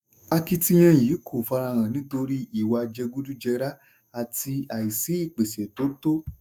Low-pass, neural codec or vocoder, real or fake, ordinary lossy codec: none; autoencoder, 48 kHz, 128 numbers a frame, DAC-VAE, trained on Japanese speech; fake; none